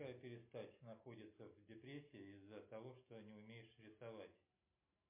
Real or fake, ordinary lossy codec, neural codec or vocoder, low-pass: real; MP3, 24 kbps; none; 3.6 kHz